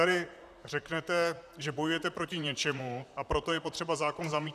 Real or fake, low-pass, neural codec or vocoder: fake; 14.4 kHz; vocoder, 44.1 kHz, 128 mel bands, Pupu-Vocoder